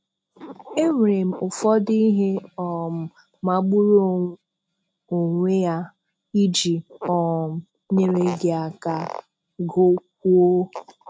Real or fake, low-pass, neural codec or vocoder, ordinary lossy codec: real; none; none; none